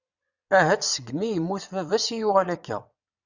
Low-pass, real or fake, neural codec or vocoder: 7.2 kHz; fake; vocoder, 22.05 kHz, 80 mel bands, WaveNeXt